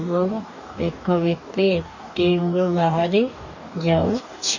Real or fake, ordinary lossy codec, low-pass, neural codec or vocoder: fake; none; 7.2 kHz; codec, 44.1 kHz, 2.6 kbps, DAC